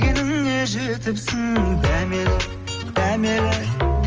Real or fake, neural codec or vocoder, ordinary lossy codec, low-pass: real; none; Opus, 24 kbps; 7.2 kHz